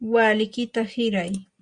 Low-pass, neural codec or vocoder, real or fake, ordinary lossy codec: 9.9 kHz; none; real; Opus, 64 kbps